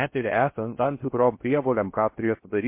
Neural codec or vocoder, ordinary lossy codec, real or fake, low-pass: codec, 16 kHz in and 24 kHz out, 0.6 kbps, FocalCodec, streaming, 2048 codes; MP3, 24 kbps; fake; 3.6 kHz